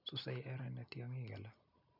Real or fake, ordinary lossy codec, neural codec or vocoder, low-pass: real; none; none; 5.4 kHz